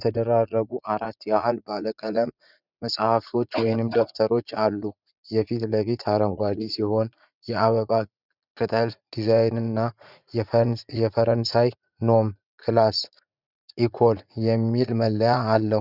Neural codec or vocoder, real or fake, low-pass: vocoder, 44.1 kHz, 80 mel bands, Vocos; fake; 5.4 kHz